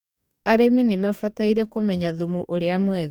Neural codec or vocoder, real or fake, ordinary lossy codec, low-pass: codec, 44.1 kHz, 2.6 kbps, DAC; fake; none; 19.8 kHz